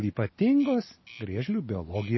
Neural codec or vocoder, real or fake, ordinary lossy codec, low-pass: none; real; MP3, 24 kbps; 7.2 kHz